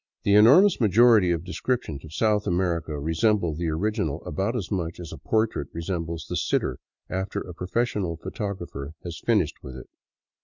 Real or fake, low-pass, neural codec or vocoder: real; 7.2 kHz; none